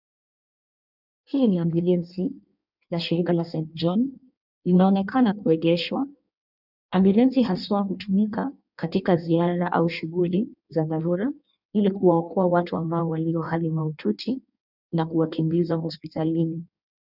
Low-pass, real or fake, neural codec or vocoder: 5.4 kHz; fake; codec, 16 kHz in and 24 kHz out, 1.1 kbps, FireRedTTS-2 codec